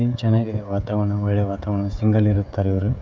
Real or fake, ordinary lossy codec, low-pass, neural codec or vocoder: fake; none; none; codec, 16 kHz, 16 kbps, FreqCodec, smaller model